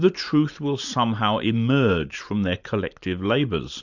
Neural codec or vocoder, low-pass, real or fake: vocoder, 44.1 kHz, 128 mel bands every 512 samples, BigVGAN v2; 7.2 kHz; fake